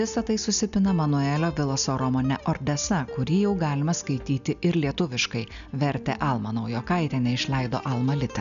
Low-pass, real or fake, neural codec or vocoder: 7.2 kHz; real; none